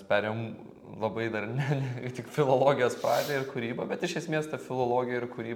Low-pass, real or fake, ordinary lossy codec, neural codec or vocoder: 19.8 kHz; real; MP3, 96 kbps; none